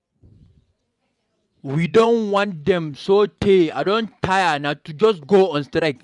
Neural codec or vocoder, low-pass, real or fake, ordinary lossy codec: vocoder, 24 kHz, 100 mel bands, Vocos; 10.8 kHz; fake; none